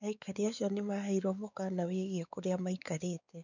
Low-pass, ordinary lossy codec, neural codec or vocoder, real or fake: 7.2 kHz; none; codec, 16 kHz, 4 kbps, X-Codec, WavLM features, trained on Multilingual LibriSpeech; fake